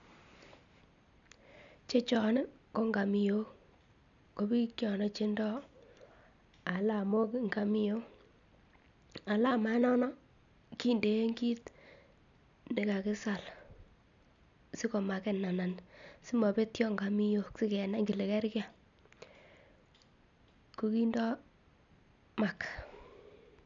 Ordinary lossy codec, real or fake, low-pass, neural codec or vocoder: none; real; 7.2 kHz; none